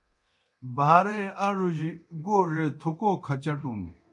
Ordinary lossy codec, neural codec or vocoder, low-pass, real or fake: MP3, 96 kbps; codec, 24 kHz, 0.9 kbps, DualCodec; 10.8 kHz; fake